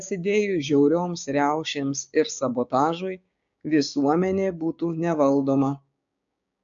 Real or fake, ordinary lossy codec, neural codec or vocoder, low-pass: fake; AAC, 64 kbps; codec, 16 kHz, 6 kbps, DAC; 7.2 kHz